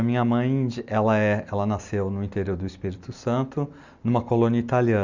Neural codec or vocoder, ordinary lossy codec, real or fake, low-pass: none; Opus, 64 kbps; real; 7.2 kHz